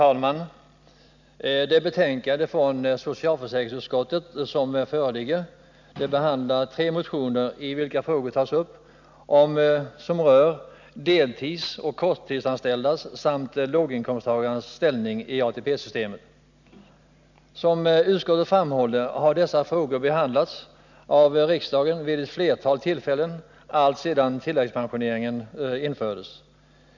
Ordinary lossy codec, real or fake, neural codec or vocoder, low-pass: none; real; none; 7.2 kHz